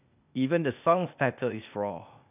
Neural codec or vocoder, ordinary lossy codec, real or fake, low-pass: codec, 16 kHz, 0.8 kbps, ZipCodec; none; fake; 3.6 kHz